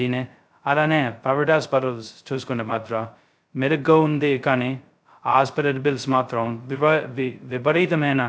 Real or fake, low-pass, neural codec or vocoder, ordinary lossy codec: fake; none; codec, 16 kHz, 0.2 kbps, FocalCodec; none